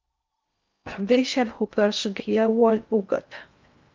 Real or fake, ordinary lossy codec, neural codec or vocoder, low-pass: fake; Opus, 24 kbps; codec, 16 kHz in and 24 kHz out, 0.6 kbps, FocalCodec, streaming, 4096 codes; 7.2 kHz